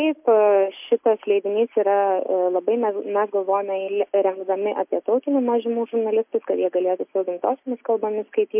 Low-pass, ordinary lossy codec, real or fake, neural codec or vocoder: 3.6 kHz; MP3, 32 kbps; real; none